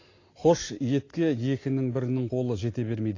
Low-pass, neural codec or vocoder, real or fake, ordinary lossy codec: 7.2 kHz; none; real; AAC, 32 kbps